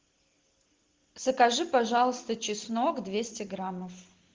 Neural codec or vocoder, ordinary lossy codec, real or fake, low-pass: none; Opus, 16 kbps; real; 7.2 kHz